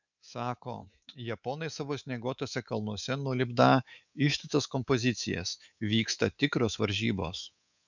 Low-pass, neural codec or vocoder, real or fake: 7.2 kHz; codec, 24 kHz, 3.1 kbps, DualCodec; fake